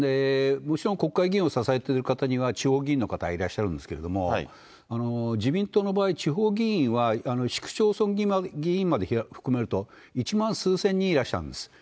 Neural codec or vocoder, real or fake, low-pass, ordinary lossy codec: none; real; none; none